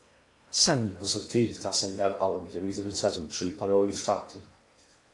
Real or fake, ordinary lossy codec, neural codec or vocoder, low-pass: fake; AAC, 48 kbps; codec, 16 kHz in and 24 kHz out, 0.8 kbps, FocalCodec, streaming, 65536 codes; 10.8 kHz